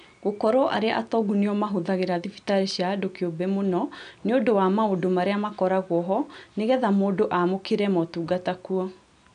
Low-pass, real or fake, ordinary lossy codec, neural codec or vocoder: 9.9 kHz; real; none; none